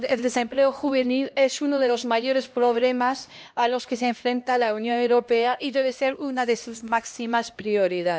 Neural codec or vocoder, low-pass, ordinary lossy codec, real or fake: codec, 16 kHz, 1 kbps, X-Codec, HuBERT features, trained on LibriSpeech; none; none; fake